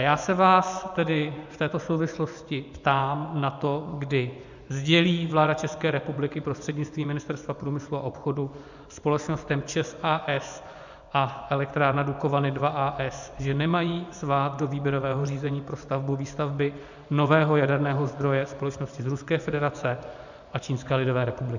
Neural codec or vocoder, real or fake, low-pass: vocoder, 24 kHz, 100 mel bands, Vocos; fake; 7.2 kHz